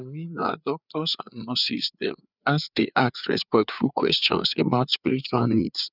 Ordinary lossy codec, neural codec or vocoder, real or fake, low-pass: none; codec, 16 kHz, 4 kbps, FreqCodec, larger model; fake; 5.4 kHz